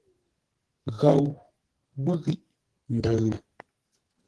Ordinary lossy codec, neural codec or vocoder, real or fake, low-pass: Opus, 16 kbps; codec, 44.1 kHz, 2.6 kbps, SNAC; fake; 10.8 kHz